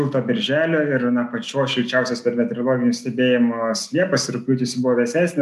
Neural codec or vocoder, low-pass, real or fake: none; 14.4 kHz; real